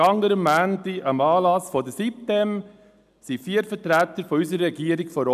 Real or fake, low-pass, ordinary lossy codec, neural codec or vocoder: real; 14.4 kHz; none; none